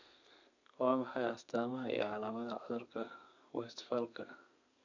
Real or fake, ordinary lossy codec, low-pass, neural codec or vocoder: fake; none; 7.2 kHz; codec, 32 kHz, 1.9 kbps, SNAC